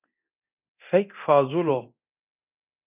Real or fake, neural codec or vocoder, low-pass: fake; codec, 24 kHz, 0.9 kbps, DualCodec; 3.6 kHz